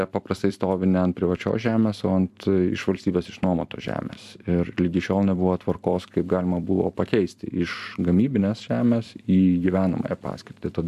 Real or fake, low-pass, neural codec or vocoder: fake; 14.4 kHz; vocoder, 44.1 kHz, 128 mel bands every 256 samples, BigVGAN v2